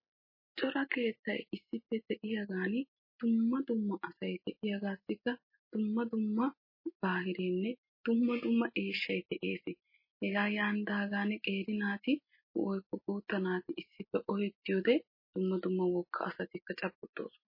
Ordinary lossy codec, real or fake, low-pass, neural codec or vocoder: MP3, 24 kbps; real; 5.4 kHz; none